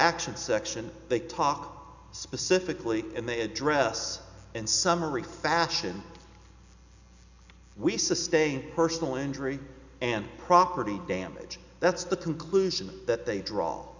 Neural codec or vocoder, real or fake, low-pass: none; real; 7.2 kHz